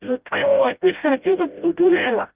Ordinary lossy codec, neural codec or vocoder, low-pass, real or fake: Opus, 24 kbps; codec, 16 kHz, 0.5 kbps, FreqCodec, smaller model; 3.6 kHz; fake